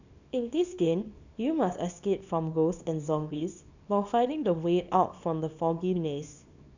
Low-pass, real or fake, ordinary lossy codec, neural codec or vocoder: 7.2 kHz; fake; none; codec, 24 kHz, 0.9 kbps, WavTokenizer, small release